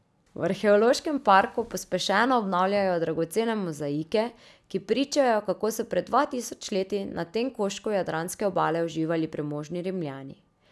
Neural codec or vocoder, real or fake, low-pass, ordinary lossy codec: none; real; none; none